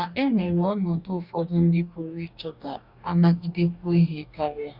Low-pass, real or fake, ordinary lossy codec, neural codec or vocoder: 5.4 kHz; fake; Opus, 64 kbps; codec, 44.1 kHz, 2.6 kbps, DAC